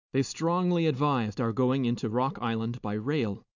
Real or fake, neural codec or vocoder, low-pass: real; none; 7.2 kHz